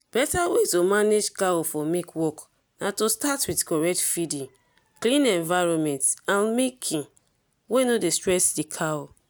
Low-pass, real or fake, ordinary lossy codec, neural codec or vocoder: none; real; none; none